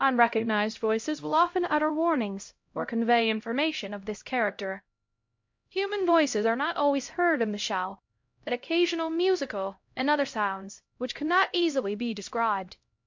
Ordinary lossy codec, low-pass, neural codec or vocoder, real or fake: MP3, 48 kbps; 7.2 kHz; codec, 16 kHz, 0.5 kbps, X-Codec, HuBERT features, trained on LibriSpeech; fake